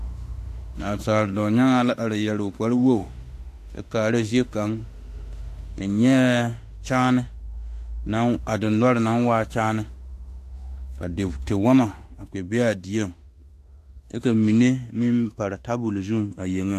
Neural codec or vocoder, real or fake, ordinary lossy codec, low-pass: autoencoder, 48 kHz, 32 numbers a frame, DAC-VAE, trained on Japanese speech; fake; AAC, 64 kbps; 14.4 kHz